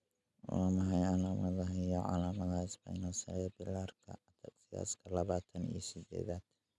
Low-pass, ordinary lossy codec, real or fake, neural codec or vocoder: none; none; real; none